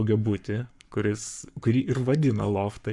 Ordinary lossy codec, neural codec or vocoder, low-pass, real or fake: MP3, 96 kbps; codec, 44.1 kHz, 7.8 kbps, Pupu-Codec; 10.8 kHz; fake